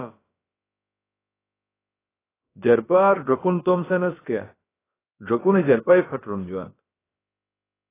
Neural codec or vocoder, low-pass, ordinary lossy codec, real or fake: codec, 16 kHz, about 1 kbps, DyCAST, with the encoder's durations; 3.6 kHz; AAC, 16 kbps; fake